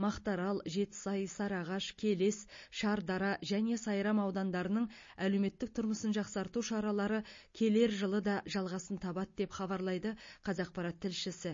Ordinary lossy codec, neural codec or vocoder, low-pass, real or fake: MP3, 32 kbps; none; 7.2 kHz; real